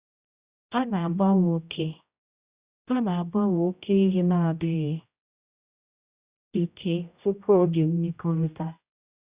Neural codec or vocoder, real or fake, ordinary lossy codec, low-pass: codec, 16 kHz, 0.5 kbps, X-Codec, HuBERT features, trained on general audio; fake; Opus, 64 kbps; 3.6 kHz